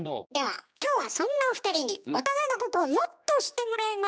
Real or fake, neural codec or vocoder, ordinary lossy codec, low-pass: fake; codec, 16 kHz, 2 kbps, X-Codec, HuBERT features, trained on general audio; none; none